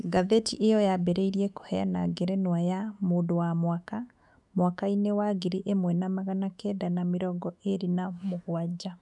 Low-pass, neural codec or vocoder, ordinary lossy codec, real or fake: 10.8 kHz; autoencoder, 48 kHz, 128 numbers a frame, DAC-VAE, trained on Japanese speech; none; fake